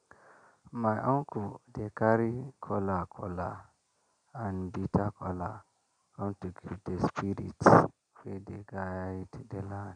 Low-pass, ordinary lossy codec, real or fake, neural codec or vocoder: 9.9 kHz; none; real; none